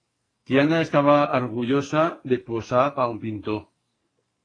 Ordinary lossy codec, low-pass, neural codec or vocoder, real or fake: AAC, 32 kbps; 9.9 kHz; codec, 44.1 kHz, 2.6 kbps, SNAC; fake